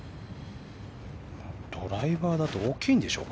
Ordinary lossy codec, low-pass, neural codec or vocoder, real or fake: none; none; none; real